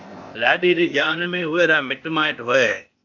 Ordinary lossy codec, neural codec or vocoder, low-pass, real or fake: AAC, 48 kbps; codec, 16 kHz, 0.8 kbps, ZipCodec; 7.2 kHz; fake